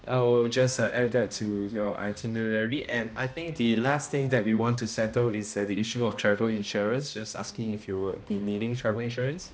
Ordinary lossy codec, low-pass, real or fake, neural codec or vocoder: none; none; fake; codec, 16 kHz, 1 kbps, X-Codec, HuBERT features, trained on balanced general audio